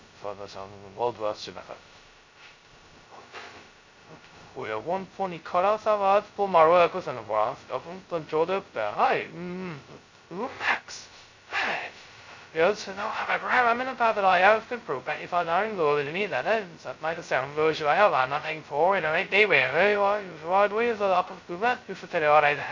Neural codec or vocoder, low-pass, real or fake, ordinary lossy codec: codec, 16 kHz, 0.2 kbps, FocalCodec; 7.2 kHz; fake; MP3, 64 kbps